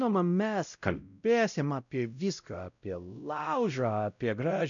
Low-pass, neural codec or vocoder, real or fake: 7.2 kHz; codec, 16 kHz, 0.5 kbps, X-Codec, WavLM features, trained on Multilingual LibriSpeech; fake